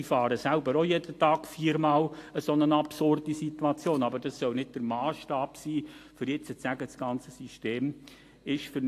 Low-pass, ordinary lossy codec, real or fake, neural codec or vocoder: 14.4 kHz; AAC, 64 kbps; real; none